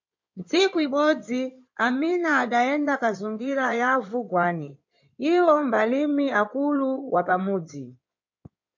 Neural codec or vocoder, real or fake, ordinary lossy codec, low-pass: codec, 16 kHz in and 24 kHz out, 2.2 kbps, FireRedTTS-2 codec; fake; MP3, 48 kbps; 7.2 kHz